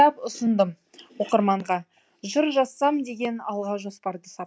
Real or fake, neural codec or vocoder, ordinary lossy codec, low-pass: real; none; none; none